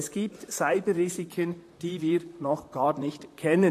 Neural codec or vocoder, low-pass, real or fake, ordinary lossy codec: vocoder, 44.1 kHz, 128 mel bands, Pupu-Vocoder; 14.4 kHz; fake; AAC, 64 kbps